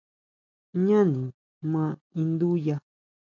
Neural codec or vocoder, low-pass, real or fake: none; 7.2 kHz; real